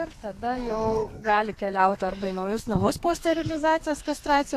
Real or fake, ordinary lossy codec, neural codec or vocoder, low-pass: fake; AAC, 64 kbps; codec, 32 kHz, 1.9 kbps, SNAC; 14.4 kHz